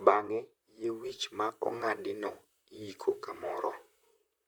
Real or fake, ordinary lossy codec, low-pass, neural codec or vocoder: fake; none; none; vocoder, 44.1 kHz, 128 mel bands, Pupu-Vocoder